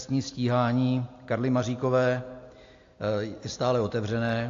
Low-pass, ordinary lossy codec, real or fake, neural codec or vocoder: 7.2 kHz; AAC, 48 kbps; real; none